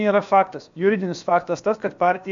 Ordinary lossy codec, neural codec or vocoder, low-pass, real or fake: MP3, 64 kbps; codec, 16 kHz, about 1 kbps, DyCAST, with the encoder's durations; 7.2 kHz; fake